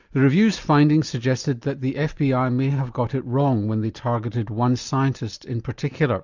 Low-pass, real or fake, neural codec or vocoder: 7.2 kHz; real; none